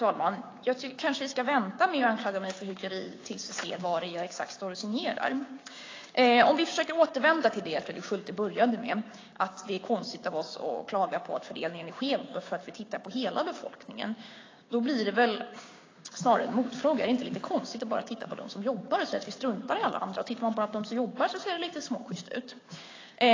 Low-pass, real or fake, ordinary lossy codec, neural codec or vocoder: 7.2 kHz; fake; AAC, 32 kbps; codec, 16 kHz, 6 kbps, DAC